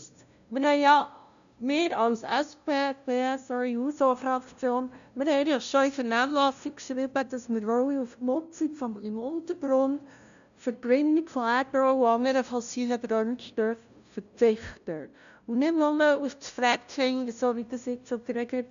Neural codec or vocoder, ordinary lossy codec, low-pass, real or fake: codec, 16 kHz, 0.5 kbps, FunCodec, trained on LibriTTS, 25 frames a second; none; 7.2 kHz; fake